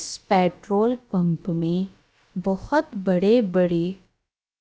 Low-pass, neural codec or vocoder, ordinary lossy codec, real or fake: none; codec, 16 kHz, about 1 kbps, DyCAST, with the encoder's durations; none; fake